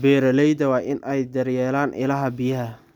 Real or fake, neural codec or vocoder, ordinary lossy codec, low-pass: real; none; none; 19.8 kHz